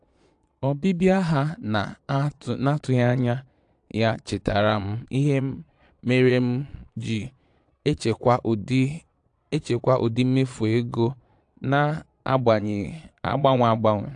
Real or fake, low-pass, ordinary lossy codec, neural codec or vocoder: fake; 9.9 kHz; AAC, 64 kbps; vocoder, 22.05 kHz, 80 mel bands, Vocos